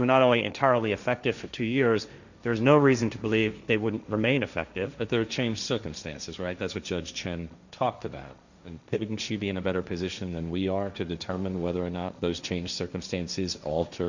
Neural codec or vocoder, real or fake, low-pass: codec, 16 kHz, 1.1 kbps, Voila-Tokenizer; fake; 7.2 kHz